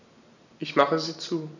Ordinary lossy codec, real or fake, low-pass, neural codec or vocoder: none; real; 7.2 kHz; none